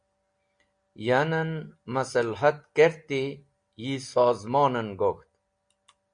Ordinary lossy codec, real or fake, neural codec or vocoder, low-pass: MP3, 64 kbps; real; none; 9.9 kHz